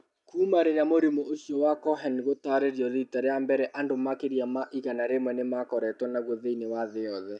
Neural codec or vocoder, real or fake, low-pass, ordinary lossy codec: none; real; 10.8 kHz; none